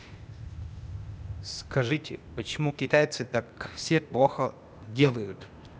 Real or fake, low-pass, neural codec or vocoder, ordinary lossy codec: fake; none; codec, 16 kHz, 0.8 kbps, ZipCodec; none